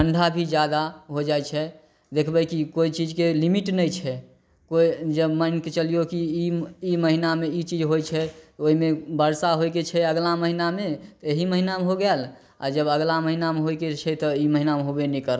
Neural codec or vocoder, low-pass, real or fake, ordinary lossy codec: none; none; real; none